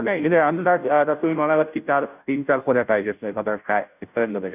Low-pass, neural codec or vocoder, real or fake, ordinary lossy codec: 3.6 kHz; codec, 16 kHz, 0.5 kbps, FunCodec, trained on Chinese and English, 25 frames a second; fake; none